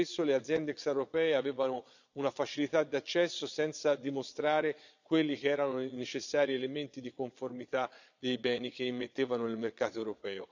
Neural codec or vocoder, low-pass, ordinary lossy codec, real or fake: vocoder, 22.05 kHz, 80 mel bands, Vocos; 7.2 kHz; none; fake